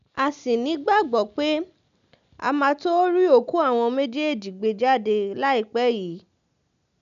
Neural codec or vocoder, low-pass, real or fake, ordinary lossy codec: none; 7.2 kHz; real; none